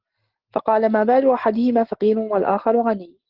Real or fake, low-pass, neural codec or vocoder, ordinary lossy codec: real; 5.4 kHz; none; Opus, 16 kbps